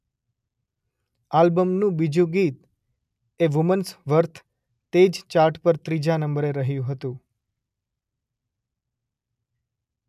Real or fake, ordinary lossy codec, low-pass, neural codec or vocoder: real; none; 14.4 kHz; none